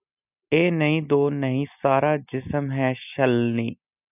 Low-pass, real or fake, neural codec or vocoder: 3.6 kHz; real; none